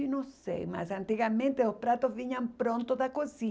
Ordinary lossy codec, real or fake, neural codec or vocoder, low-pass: none; real; none; none